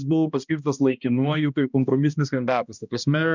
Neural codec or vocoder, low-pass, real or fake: codec, 16 kHz, 1 kbps, X-Codec, HuBERT features, trained on balanced general audio; 7.2 kHz; fake